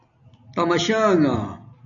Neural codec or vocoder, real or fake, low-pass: none; real; 7.2 kHz